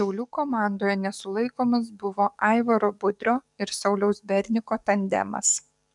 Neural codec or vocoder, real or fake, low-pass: codec, 44.1 kHz, 7.8 kbps, DAC; fake; 10.8 kHz